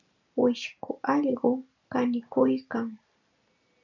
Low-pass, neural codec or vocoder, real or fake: 7.2 kHz; none; real